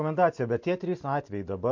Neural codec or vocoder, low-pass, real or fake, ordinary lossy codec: none; 7.2 kHz; real; MP3, 48 kbps